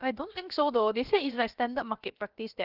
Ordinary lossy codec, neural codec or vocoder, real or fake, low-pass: Opus, 32 kbps; codec, 16 kHz, about 1 kbps, DyCAST, with the encoder's durations; fake; 5.4 kHz